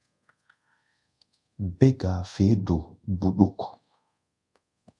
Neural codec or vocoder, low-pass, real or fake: codec, 24 kHz, 0.5 kbps, DualCodec; 10.8 kHz; fake